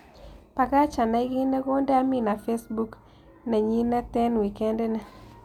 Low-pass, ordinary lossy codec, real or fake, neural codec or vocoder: 19.8 kHz; none; real; none